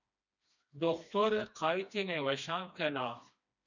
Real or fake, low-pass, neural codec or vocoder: fake; 7.2 kHz; codec, 16 kHz, 2 kbps, FreqCodec, smaller model